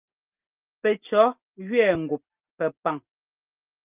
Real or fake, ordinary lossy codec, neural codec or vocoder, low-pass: real; Opus, 24 kbps; none; 3.6 kHz